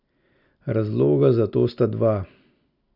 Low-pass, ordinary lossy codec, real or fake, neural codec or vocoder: 5.4 kHz; none; real; none